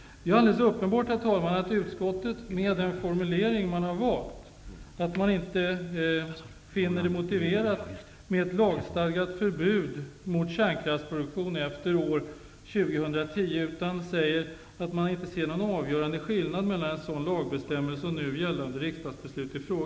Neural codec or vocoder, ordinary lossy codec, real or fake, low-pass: none; none; real; none